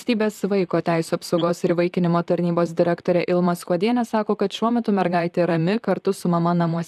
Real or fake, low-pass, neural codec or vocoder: fake; 14.4 kHz; vocoder, 44.1 kHz, 128 mel bands, Pupu-Vocoder